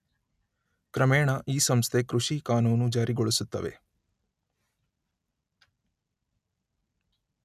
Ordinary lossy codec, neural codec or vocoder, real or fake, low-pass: none; none; real; 14.4 kHz